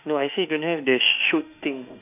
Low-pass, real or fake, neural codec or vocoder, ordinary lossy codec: 3.6 kHz; fake; autoencoder, 48 kHz, 32 numbers a frame, DAC-VAE, trained on Japanese speech; none